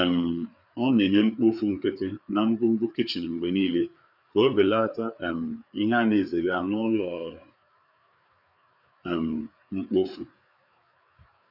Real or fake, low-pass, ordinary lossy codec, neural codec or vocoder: fake; 5.4 kHz; none; codec, 16 kHz, 4 kbps, FreqCodec, larger model